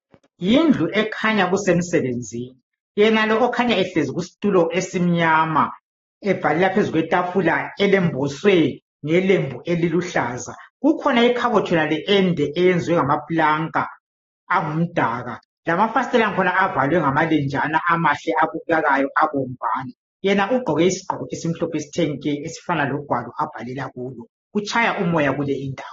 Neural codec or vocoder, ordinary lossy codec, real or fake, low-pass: none; AAC, 24 kbps; real; 7.2 kHz